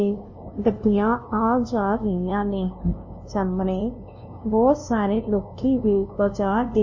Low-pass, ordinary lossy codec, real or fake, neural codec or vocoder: 7.2 kHz; MP3, 32 kbps; fake; codec, 16 kHz, 0.5 kbps, FunCodec, trained on LibriTTS, 25 frames a second